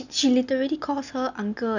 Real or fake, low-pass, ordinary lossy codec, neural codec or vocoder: real; 7.2 kHz; none; none